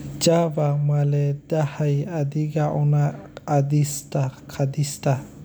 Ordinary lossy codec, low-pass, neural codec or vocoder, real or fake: none; none; none; real